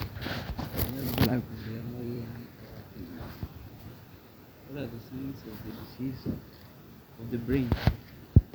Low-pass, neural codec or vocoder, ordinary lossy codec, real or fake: none; vocoder, 44.1 kHz, 128 mel bands every 256 samples, BigVGAN v2; none; fake